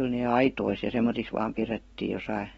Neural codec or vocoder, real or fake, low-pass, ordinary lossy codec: none; real; 19.8 kHz; AAC, 24 kbps